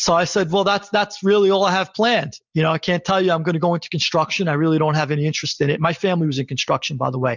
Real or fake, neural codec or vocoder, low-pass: real; none; 7.2 kHz